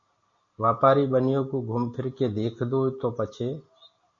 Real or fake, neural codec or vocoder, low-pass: real; none; 7.2 kHz